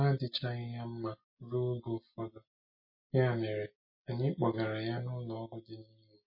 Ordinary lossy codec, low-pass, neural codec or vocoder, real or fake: MP3, 24 kbps; 5.4 kHz; none; real